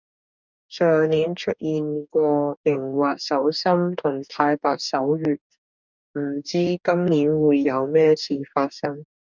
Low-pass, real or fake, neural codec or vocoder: 7.2 kHz; fake; codec, 44.1 kHz, 2.6 kbps, DAC